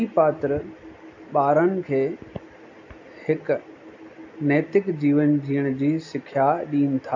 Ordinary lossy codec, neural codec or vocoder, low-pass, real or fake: AAC, 48 kbps; none; 7.2 kHz; real